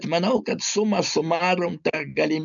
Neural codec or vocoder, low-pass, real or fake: none; 7.2 kHz; real